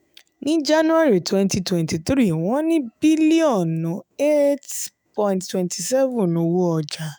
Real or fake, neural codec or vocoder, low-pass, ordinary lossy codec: fake; autoencoder, 48 kHz, 128 numbers a frame, DAC-VAE, trained on Japanese speech; none; none